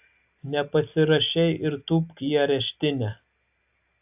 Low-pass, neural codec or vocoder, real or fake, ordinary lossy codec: 3.6 kHz; none; real; AAC, 32 kbps